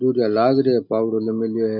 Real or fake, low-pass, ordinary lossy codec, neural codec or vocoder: real; 5.4 kHz; none; none